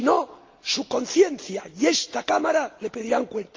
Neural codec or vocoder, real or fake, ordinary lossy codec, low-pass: none; real; Opus, 24 kbps; 7.2 kHz